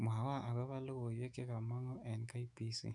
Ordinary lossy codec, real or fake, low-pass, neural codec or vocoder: none; fake; 10.8 kHz; autoencoder, 48 kHz, 128 numbers a frame, DAC-VAE, trained on Japanese speech